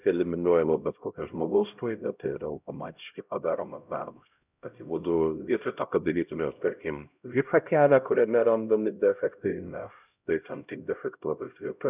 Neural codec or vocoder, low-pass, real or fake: codec, 16 kHz, 0.5 kbps, X-Codec, HuBERT features, trained on LibriSpeech; 3.6 kHz; fake